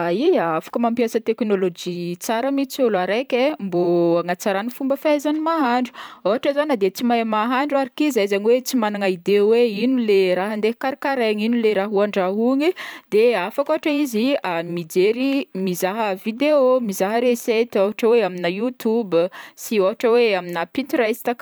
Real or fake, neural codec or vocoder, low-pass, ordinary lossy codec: fake; vocoder, 44.1 kHz, 128 mel bands every 512 samples, BigVGAN v2; none; none